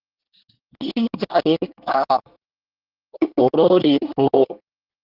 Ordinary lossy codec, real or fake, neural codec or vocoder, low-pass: Opus, 16 kbps; fake; codec, 24 kHz, 1 kbps, SNAC; 5.4 kHz